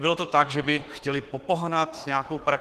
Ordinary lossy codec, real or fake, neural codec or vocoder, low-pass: Opus, 16 kbps; fake; autoencoder, 48 kHz, 32 numbers a frame, DAC-VAE, trained on Japanese speech; 14.4 kHz